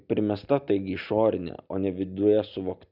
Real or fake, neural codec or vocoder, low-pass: real; none; 5.4 kHz